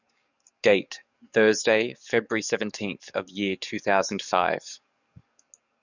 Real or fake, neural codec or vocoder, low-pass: fake; codec, 44.1 kHz, 7.8 kbps, DAC; 7.2 kHz